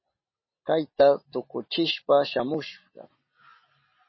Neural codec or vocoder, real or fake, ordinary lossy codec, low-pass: none; real; MP3, 24 kbps; 7.2 kHz